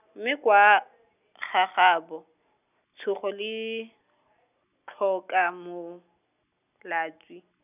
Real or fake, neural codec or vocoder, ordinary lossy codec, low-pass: real; none; none; 3.6 kHz